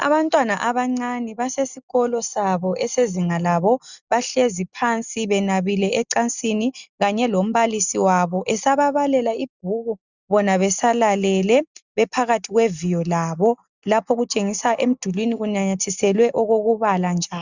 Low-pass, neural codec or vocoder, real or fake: 7.2 kHz; none; real